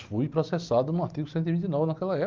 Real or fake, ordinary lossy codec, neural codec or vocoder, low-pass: real; Opus, 32 kbps; none; 7.2 kHz